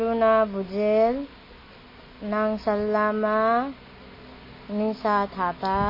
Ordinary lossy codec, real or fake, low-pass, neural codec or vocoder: MP3, 24 kbps; real; 5.4 kHz; none